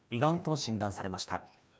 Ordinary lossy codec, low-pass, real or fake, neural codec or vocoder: none; none; fake; codec, 16 kHz, 1 kbps, FreqCodec, larger model